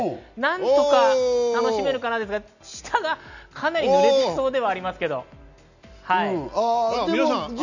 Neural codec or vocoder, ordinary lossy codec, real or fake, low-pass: none; none; real; 7.2 kHz